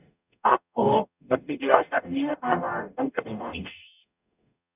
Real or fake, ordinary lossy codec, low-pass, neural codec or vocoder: fake; none; 3.6 kHz; codec, 44.1 kHz, 0.9 kbps, DAC